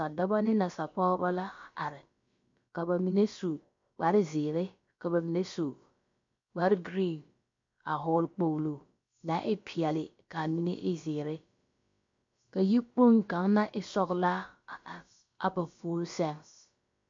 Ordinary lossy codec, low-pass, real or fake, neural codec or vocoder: MP3, 48 kbps; 7.2 kHz; fake; codec, 16 kHz, about 1 kbps, DyCAST, with the encoder's durations